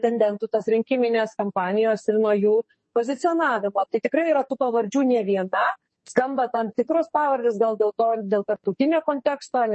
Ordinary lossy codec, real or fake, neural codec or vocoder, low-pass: MP3, 32 kbps; fake; codec, 44.1 kHz, 2.6 kbps, SNAC; 10.8 kHz